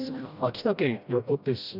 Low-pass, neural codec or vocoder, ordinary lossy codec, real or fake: 5.4 kHz; codec, 16 kHz, 1 kbps, FreqCodec, smaller model; none; fake